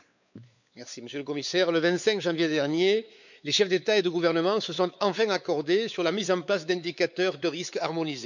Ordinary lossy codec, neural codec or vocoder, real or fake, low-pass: none; codec, 16 kHz, 4 kbps, X-Codec, WavLM features, trained on Multilingual LibriSpeech; fake; 7.2 kHz